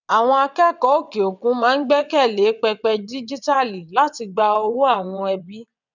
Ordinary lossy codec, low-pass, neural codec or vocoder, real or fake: none; 7.2 kHz; vocoder, 22.05 kHz, 80 mel bands, WaveNeXt; fake